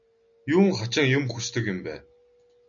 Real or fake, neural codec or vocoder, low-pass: real; none; 7.2 kHz